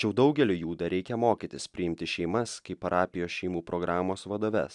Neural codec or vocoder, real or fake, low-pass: none; real; 10.8 kHz